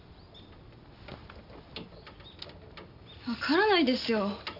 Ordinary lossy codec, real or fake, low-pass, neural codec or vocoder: none; real; 5.4 kHz; none